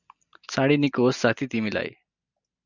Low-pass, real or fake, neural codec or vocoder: 7.2 kHz; real; none